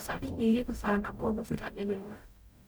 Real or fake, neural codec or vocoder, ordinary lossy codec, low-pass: fake; codec, 44.1 kHz, 0.9 kbps, DAC; none; none